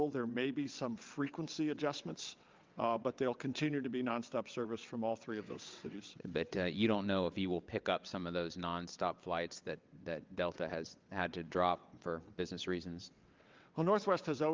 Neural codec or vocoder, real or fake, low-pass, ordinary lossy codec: vocoder, 22.05 kHz, 80 mel bands, WaveNeXt; fake; 7.2 kHz; Opus, 24 kbps